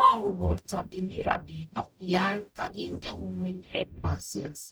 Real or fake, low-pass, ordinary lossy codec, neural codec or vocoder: fake; none; none; codec, 44.1 kHz, 0.9 kbps, DAC